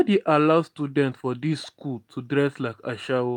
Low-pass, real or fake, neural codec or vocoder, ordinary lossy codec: 19.8 kHz; real; none; MP3, 96 kbps